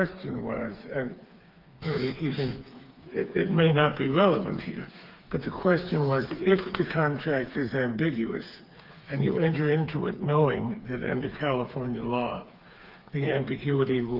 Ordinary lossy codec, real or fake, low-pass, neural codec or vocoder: Opus, 24 kbps; fake; 5.4 kHz; codec, 16 kHz, 4 kbps, FreqCodec, smaller model